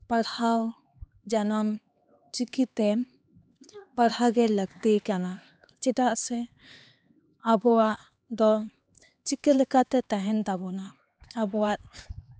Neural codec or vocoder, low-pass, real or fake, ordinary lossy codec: codec, 16 kHz, 2 kbps, X-Codec, HuBERT features, trained on LibriSpeech; none; fake; none